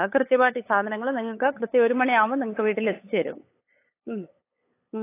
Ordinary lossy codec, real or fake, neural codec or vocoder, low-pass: AAC, 24 kbps; fake; codec, 16 kHz, 8 kbps, FunCodec, trained on LibriTTS, 25 frames a second; 3.6 kHz